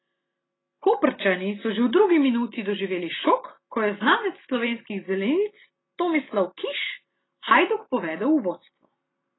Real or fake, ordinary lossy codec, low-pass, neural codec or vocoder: real; AAC, 16 kbps; 7.2 kHz; none